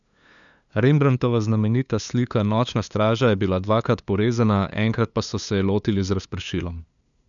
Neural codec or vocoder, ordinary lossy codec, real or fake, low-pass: codec, 16 kHz, 2 kbps, FunCodec, trained on LibriTTS, 25 frames a second; none; fake; 7.2 kHz